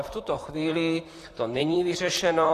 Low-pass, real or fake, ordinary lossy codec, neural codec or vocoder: 14.4 kHz; fake; AAC, 48 kbps; vocoder, 44.1 kHz, 128 mel bands, Pupu-Vocoder